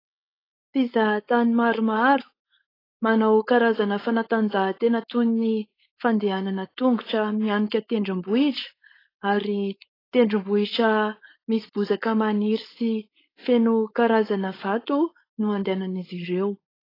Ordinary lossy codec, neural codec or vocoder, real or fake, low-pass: AAC, 24 kbps; codec, 16 kHz, 4.8 kbps, FACodec; fake; 5.4 kHz